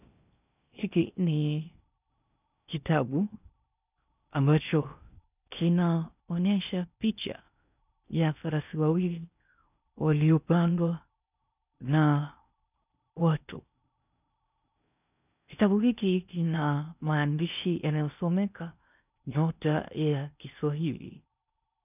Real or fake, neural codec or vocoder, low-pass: fake; codec, 16 kHz in and 24 kHz out, 0.6 kbps, FocalCodec, streaming, 2048 codes; 3.6 kHz